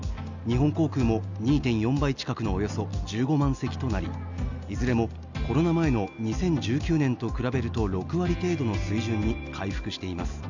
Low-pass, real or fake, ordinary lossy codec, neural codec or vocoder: 7.2 kHz; real; none; none